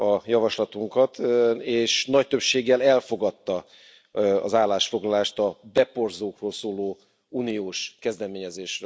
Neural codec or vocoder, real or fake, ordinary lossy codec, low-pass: none; real; none; none